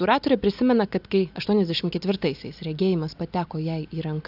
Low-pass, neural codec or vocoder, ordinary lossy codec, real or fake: 5.4 kHz; none; AAC, 48 kbps; real